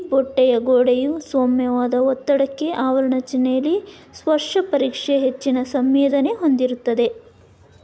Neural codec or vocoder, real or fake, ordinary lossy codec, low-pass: none; real; none; none